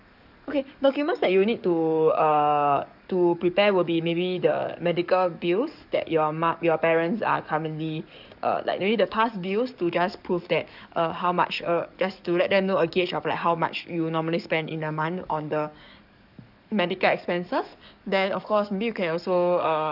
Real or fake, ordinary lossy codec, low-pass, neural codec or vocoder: fake; none; 5.4 kHz; codec, 44.1 kHz, 7.8 kbps, DAC